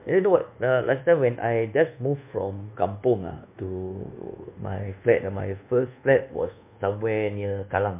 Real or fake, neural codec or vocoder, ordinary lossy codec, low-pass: fake; codec, 24 kHz, 1.2 kbps, DualCodec; MP3, 32 kbps; 3.6 kHz